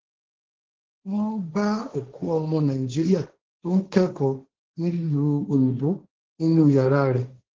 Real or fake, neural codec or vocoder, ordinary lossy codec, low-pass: fake; codec, 16 kHz, 1.1 kbps, Voila-Tokenizer; Opus, 16 kbps; 7.2 kHz